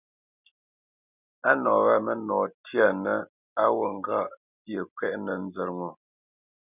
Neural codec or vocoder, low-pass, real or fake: none; 3.6 kHz; real